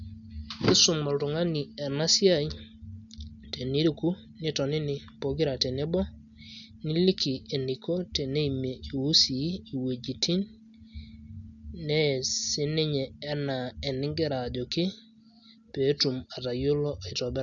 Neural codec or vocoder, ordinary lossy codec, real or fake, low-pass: none; none; real; 7.2 kHz